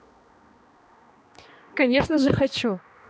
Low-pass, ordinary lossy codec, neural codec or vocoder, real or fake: none; none; codec, 16 kHz, 2 kbps, X-Codec, HuBERT features, trained on balanced general audio; fake